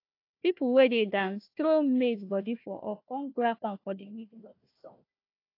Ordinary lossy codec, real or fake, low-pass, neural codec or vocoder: AAC, 32 kbps; fake; 5.4 kHz; codec, 16 kHz, 1 kbps, FunCodec, trained on Chinese and English, 50 frames a second